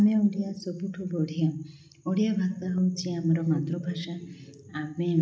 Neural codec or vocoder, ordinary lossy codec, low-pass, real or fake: none; none; none; real